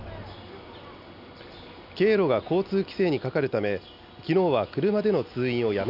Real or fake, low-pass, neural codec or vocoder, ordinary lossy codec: real; 5.4 kHz; none; none